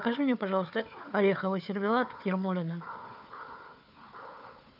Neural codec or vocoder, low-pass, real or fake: codec, 16 kHz, 4 kbps, FunCodec, trained on Chinese and English, 50 frames a second; 5.4 kHz; fake